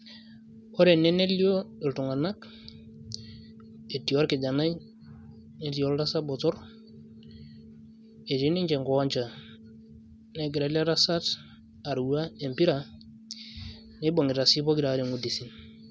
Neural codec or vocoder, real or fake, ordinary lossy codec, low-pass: none; real; none; none